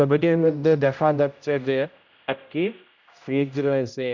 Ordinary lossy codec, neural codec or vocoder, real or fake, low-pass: none; codec, 16 kHz, 0.5 kbps, X-Codec, HuBERT features, trained on general audio; fake; 7.2 kHz